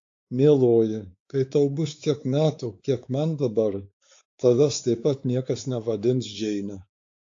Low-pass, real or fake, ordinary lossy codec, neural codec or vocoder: 7.2 kHz; fake; AAC, 48 kbps; codec, 16 kHz, 4 kbps, X-Codec, WavLM features, trained on Multilingual LibriSpeech